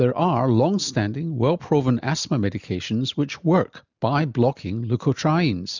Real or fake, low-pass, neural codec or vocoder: real; 7.2 kHz; none